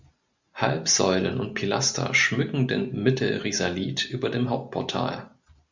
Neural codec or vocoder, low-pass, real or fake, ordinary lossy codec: none; 7.2 kHz; real; Opus, 64 kbps